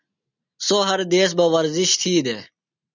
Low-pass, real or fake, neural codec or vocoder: 7.2 kHz; real; none